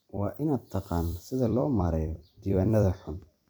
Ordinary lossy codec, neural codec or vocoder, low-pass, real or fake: none; vocoder, 44.1 kHz, 128 mel bands every 256 samples, BigVGAN v2; none; fake